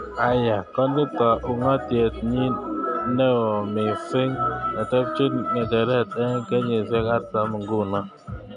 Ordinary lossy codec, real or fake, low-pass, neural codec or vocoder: none; real; 9.9 kHz; none